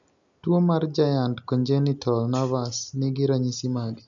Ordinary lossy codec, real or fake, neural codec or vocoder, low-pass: none; real; none; 7.2 kHz